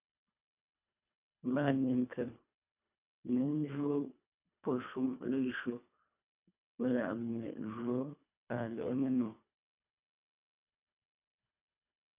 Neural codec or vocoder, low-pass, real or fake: codec, 24 kHz, 1.5 kbps, HILCodec; 3.6 kHz; fake